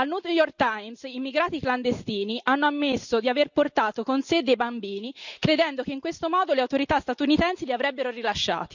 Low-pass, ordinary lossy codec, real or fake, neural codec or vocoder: 7.2 kHz; none; real; none